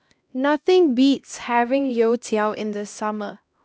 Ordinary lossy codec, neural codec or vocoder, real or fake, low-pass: none; codec, 16 kHz, 1 kbps, X-Codec, HuBERT features, trained on LibriSpeech; fake; none